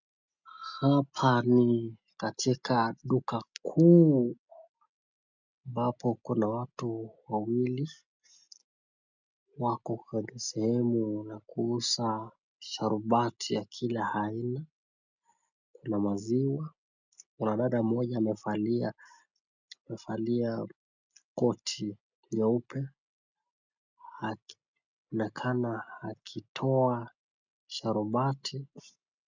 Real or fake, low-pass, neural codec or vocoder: real; 7.2 kHz; none